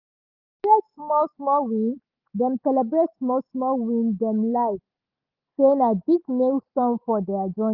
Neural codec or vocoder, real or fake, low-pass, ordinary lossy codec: none; real; 5.4 kHz; Opus, 32 kbps